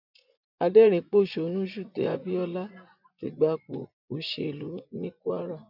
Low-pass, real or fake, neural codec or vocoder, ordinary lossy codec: 5.4 kHz; fake; vocoder, 24 kHz, 100 mel bands, Vocos; none